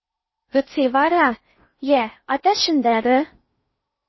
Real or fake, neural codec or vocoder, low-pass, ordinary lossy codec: fake; codec, 16 kHz in and 24 kHz out, 0.6 kbps, FocalCodec, streaming, 4096 codes; 7.2 kHz; MP3, 24 kbps